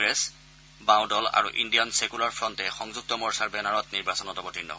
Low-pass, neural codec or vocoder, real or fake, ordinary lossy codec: none; none; real; none